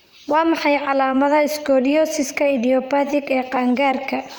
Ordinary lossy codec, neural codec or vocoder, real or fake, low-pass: none; vocoder, 44.1 kHz, 128 mel bands, Pupu-Vocoder; fake; none